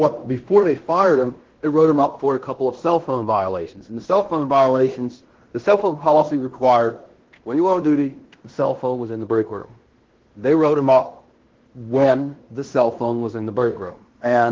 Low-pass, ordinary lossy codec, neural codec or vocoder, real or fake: 7.2 kHz; Opus, 16 kbps; codec, 16 kHz in and 24 kHz out, 0.9 kbps, LongCat-Audio-Codec, fine tuned four codebook decoder; fake